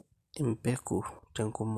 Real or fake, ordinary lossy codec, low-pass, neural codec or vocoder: real; MP3, 96 kbps; 14.4 kHz; none